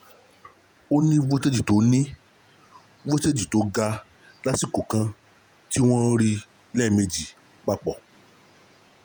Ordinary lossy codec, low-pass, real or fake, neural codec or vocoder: none; none; fake; vocoder, 48 kHz, 128 mel bands, Vocos